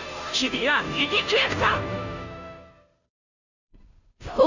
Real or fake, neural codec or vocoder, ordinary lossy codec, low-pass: fake; codec, 16 kHz, 0.5 kbps, FunCodec, trained on Chinese and English, 25 frames a second; none; 7.2 kHz